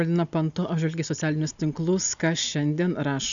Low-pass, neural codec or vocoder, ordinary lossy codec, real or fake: 7.2 kHz; none; MP3, 96 kbps; real